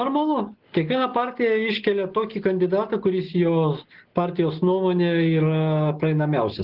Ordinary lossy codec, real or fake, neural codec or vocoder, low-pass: Opus, 16 kbps; real; none; 5.4 kHz